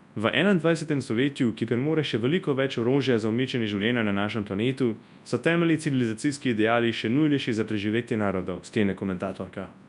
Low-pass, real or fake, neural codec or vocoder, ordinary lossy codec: 10.8 kHz; fake; codec, 24 kHz, 0.9 kbps, WavTokenizer, large speech release; none